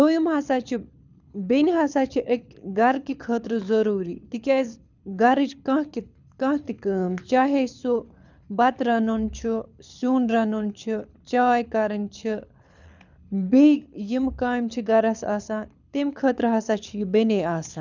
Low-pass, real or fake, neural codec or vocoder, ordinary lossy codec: 7.2 kHz; fake; codec, 24 kHz, 6 kbps, HILCodec; none